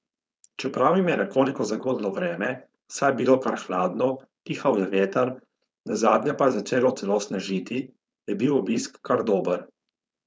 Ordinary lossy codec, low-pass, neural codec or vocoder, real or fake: none; none; codec, 16 kHz, 4.8 kbps, FACodec; fake